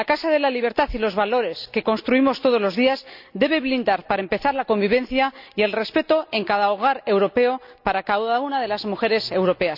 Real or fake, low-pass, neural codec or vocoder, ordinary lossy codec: real; 5.4 kHz; none; none